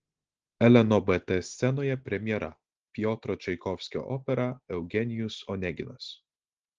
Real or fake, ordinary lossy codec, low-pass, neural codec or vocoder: real; Opus, 16 kbps; 7.2 kHz; none